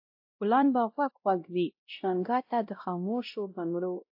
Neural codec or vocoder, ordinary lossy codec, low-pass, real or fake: codec, 16 kHz, 0.5 kbps, X-Codec, WavLM features, trained on Multilingual LibriSpeech; AAC, 48 kbps; 5.4 kHz; fake